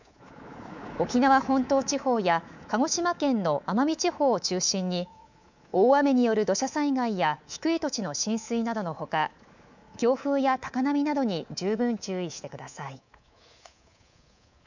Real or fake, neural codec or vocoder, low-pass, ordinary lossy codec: fake; codec, 24 kHz, 3.1 kbps, DualCodec; 7.2 kHz; none